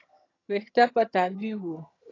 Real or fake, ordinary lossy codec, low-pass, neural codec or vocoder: fake; AAC, 32 kbps; 7.2 kHz; vocoder, 22.05 kHz, 80 mel bands, HiFi-GAN